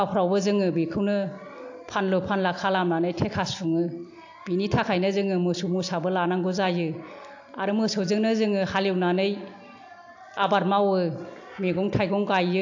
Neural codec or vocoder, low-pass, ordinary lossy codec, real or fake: none; 7.2 kHz; AAC, 48 kbps; real